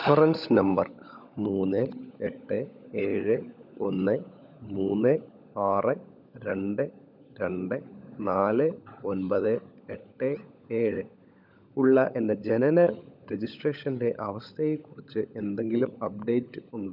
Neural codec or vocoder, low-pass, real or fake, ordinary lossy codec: codec, 16 kHz, 16 kbps, FunCodec, trained on LibriTTS, 50 frames a second; 5.4 kHz; fake; none